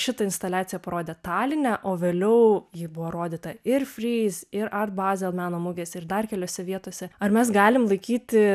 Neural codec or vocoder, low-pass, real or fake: none; 14.4 kHz; real